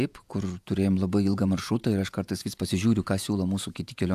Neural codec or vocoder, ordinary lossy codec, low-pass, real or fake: vocoder, 48 kHz, 128 mel bands, Vocos; AAC, 96 kbps; 14.4 kHz; fake